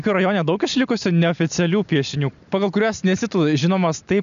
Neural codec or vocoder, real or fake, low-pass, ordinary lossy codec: none; real; 7.2 kHz; MP3, 96 kbps